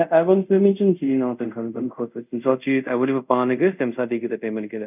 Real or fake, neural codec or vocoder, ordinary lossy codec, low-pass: fake; codec, 24 kHz, 0.5 kbps, DualCodec; none; 3.6 kHz